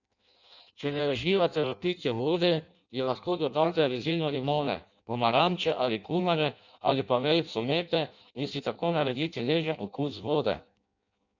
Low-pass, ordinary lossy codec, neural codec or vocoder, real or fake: 7.2 kHz; none; codec, 16 kHz in and 24 kHz out, 0.6 kbps, FireRedTTS-2 codec; fake